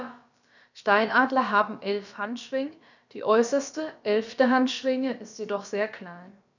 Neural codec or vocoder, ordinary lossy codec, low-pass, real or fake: codec, 16 kHz, about 1 kbps, DyCAST, with the encoder's durations; none; 7.2 kHz; fake